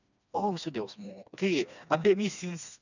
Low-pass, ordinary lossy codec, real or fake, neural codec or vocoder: 7.2 kHz; none; fake; codec, 16 kHz, 2 kbps, FreqCodec, smaller model